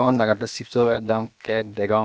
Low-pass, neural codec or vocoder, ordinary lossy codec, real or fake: none; codec, 16 kHz, about 1 kbps, DyCAST, with the encoder's durations; none; fake